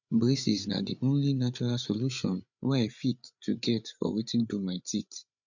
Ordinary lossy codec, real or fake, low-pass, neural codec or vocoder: none; fake; 7.2 kHz; codec, 16 kHz, 8 kbps, FreqCodec, larger model